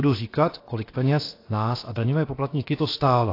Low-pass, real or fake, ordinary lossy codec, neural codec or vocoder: 5.4 kHz; fake; AAC, 32 kbps; codec, 16 kHz, about 1 kbps, DyCAST, with the encoder's durations